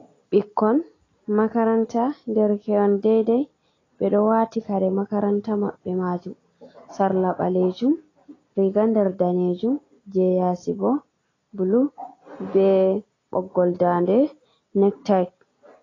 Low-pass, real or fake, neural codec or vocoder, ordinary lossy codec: 7.2 kHz; real; none; AAC, 32 kbps